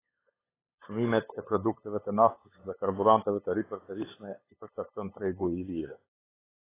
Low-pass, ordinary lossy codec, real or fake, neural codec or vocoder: 3.6 kHz; AAC, 16 kbps; fake; codec, 16 kHz, 8 kbps, FunCodec, trained on LibriTTS, 25 frames a second